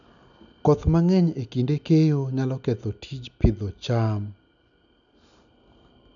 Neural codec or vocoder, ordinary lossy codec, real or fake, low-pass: none; none; real; 7.2 kHz